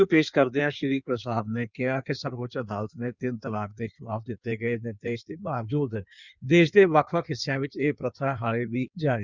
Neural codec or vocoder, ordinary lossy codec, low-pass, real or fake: codec, 16 kHz in and 24 kHz out, 1.1 kbps, FireRedTTS-2 codec; Opus, 64 kbps; 7.2 kHz; fake